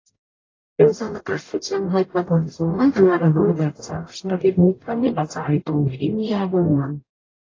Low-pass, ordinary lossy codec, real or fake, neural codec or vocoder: 7.2 kHz; AAC, 32 kbps; fake; codec, 44.1 kHz, 0.9 kbps, DAC